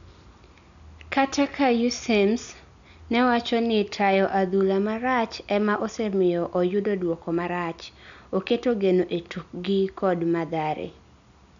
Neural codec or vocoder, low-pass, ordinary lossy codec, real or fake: none; 7.2 kHz; none; real